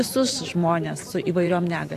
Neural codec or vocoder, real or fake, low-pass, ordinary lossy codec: vocoder, 44.1 kHz, 128 mel bands every 512 samples, BigVGAN v2; fake; 14.4 kHz; AAC, 64 kbps